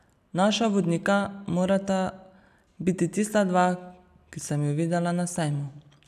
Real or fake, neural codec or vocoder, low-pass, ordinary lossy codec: real; none; 14.4 kHz; none